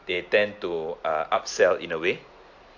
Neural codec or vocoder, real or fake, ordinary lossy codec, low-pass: none; real; AAC, 48 kbps; 7.2 kHz